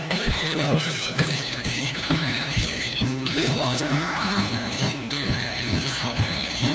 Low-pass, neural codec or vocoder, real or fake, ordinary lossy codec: none; codec, 16 kHz, 1 kbps, FunCodec, trained on LibriTTS, 50 frames a second; fake; none